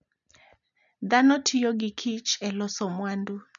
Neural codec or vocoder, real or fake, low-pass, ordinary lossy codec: none; real; 7.2 kHz; none